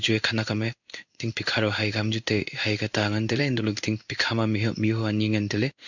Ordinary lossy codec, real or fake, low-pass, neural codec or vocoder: none; fake; 7.2 kHz; codec, 16 kHz in and 24 kHz out, 1 kbps, XY-Tokenizer